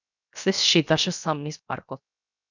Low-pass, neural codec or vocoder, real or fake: 7.2 kHz; codec, 16 kHz, 0.7 kbps, FocalCodec; fake